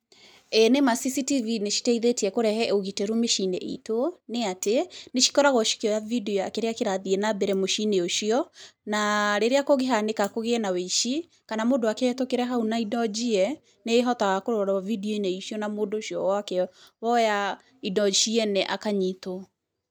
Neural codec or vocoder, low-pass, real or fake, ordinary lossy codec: none; none; real; none